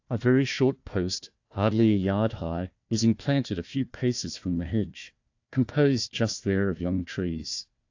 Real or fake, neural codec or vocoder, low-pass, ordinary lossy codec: fake; codec, 16 kHz, 1 kbps, FunCodec, trained on Chinese and English, 50 frames a second; 7.2 kHz; AAC, 48 kbps